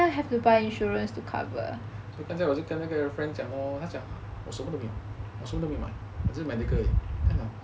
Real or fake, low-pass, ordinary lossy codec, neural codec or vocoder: real; none; none; none